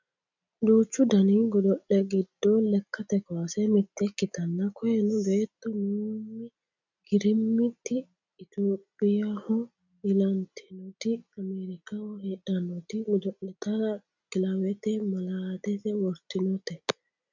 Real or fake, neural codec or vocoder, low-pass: real; none; 7.2 kHz